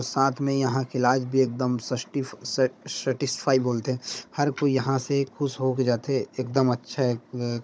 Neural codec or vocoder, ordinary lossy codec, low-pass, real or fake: codec, 16 kHz, 16 kbps, FunCodec, trained on Chinese and English, 50 frames a second; none; none; fake